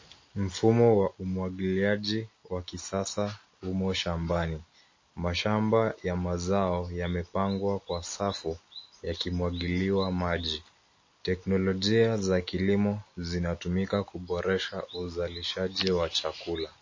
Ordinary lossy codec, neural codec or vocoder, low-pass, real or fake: MP3, 32 kbps; none; 7.2 kHz; real